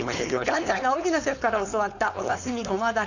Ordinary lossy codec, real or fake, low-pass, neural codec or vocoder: none; fake; 7.2 kHz; codec, 16 kHz, 4.8 kbps, FACodec